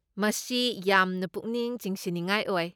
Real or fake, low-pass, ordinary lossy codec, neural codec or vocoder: real; none; none; none